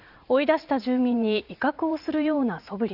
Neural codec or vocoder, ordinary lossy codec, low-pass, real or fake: vocoder, 44.1 kHz, 80 mel bands, Vocos; none; 5.4 kHz; fake